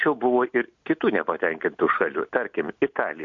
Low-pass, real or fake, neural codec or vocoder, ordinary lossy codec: 7.2 kHz; real; none; AAC, 64 kbps